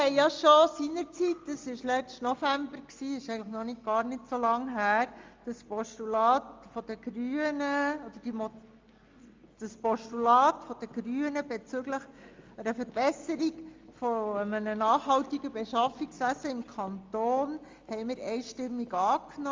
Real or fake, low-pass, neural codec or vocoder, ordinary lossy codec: real; 7.2 kHz; none; Opus, 32 kbps